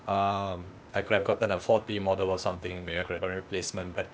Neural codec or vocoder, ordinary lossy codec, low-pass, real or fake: codec, 16 kHz, 0.8 kbps, ZipCodec; none; none; fake